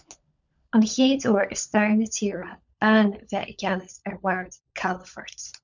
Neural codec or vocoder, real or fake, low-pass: codec, 16 kHz, 16 kbps, FunCodec, trained on LibriTTS, 50 frames a second; fake; 7.2 kHz